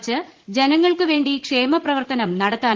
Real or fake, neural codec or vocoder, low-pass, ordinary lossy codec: fake; vocoder, 44.1 kHz, 80 mel bands, Vocos; 7.2 kHz; Opus, 16 kbps